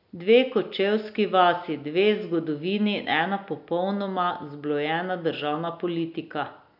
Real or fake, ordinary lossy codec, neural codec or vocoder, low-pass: real; none; none; 5.4 kHz